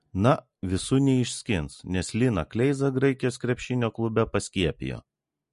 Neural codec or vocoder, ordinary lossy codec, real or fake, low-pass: none; MP3, 48 kbps; real; 14.4 kHz